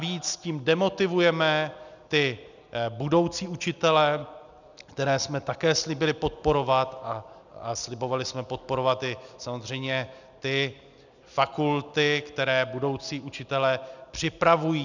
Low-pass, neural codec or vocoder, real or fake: 7.2 kHz; none; real